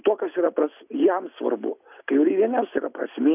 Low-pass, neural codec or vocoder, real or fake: 3.6 kHz; none; real